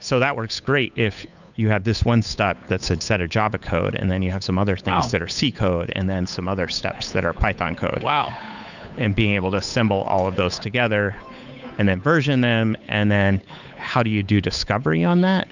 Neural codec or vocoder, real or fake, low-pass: codec, 16 kHz, 8 kbps, FunCodec, trained on Chinese and English, 25 frames a second; fake; 7.2 kHz